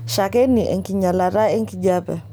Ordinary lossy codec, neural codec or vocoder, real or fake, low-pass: none; none; real; none